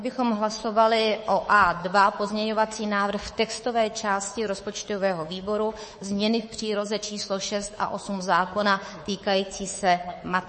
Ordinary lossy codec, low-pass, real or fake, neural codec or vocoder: MP3, 32 kbps; 10.8 kHz; fake; codec, 24 kHz, 3.1 kbps, DualCodec